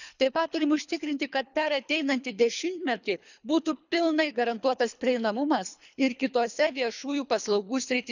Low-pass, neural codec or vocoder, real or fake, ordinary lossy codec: 7.2 kHz; codec, 24 kHz, 3 kbps, HILCodec; fake; none